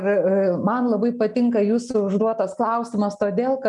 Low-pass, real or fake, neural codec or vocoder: 10.8 kHz; real; none